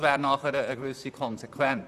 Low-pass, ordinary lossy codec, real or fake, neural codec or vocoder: 14.4 kHz; none; fake; vocoder, 44.1 kHz, 128 mel bands, Pupu-Vocoder